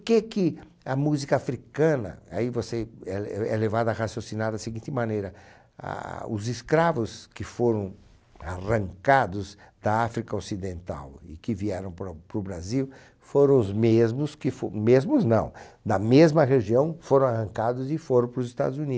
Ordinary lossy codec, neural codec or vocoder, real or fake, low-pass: none; none; real; none